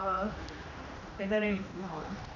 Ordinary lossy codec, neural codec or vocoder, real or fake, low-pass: none; codec, 16 kHz, 1 kbps, X-Codec, HuBERT features, trained on general audio; fake; 7.2 kHz